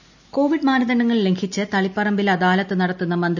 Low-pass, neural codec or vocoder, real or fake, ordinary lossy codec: 7.2 kHz; none; real; none